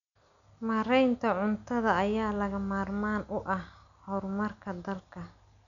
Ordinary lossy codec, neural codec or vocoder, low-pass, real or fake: none; none; 7.2 kHz; real